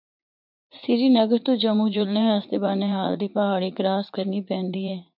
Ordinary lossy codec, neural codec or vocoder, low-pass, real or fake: MP3, 48 kbps; vocoder, 44.1 kHz, 80 mel bands, Vocos; 5.4 kHz; fake